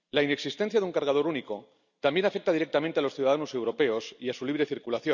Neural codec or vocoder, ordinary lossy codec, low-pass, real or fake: none; none; 7.2 kHz; real